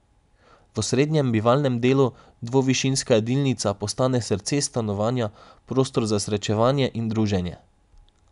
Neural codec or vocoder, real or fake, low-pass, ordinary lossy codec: none; real; 10.8 kHz; none